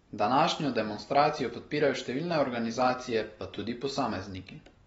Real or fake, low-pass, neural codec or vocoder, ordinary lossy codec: real; 19.8 kHz; none; AAC, 24 kbps